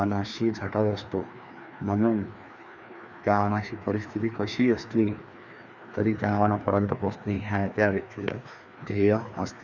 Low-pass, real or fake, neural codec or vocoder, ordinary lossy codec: 7.2 kHz; fake; codec, 16 kHz, 2 kbps, FreqCodec, larger model; Opus, 64 kbps